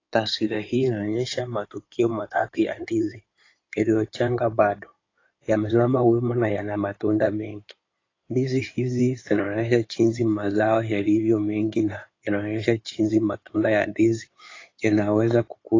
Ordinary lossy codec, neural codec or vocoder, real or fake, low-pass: AAC, 32 kbps; codec, 16 kHz in and 24 kHz out, 2.2 kbps, FireRedTTS-2 codec; fake; 7.2 kHz